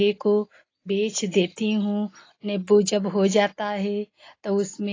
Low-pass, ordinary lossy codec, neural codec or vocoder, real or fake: 7.2 kHz; AAC, 32 kbps; none; real